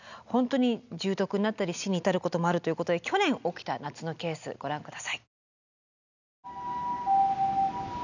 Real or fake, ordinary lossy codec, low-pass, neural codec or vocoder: real; none; 7.2 kHz; none